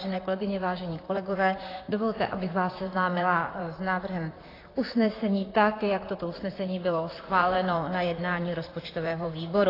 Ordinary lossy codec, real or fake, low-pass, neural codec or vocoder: AAC, 24 kbps; fake; 5.4 kHz; vocoder, 44.1 kHz, 128 mel bands, Pupu-Vocoder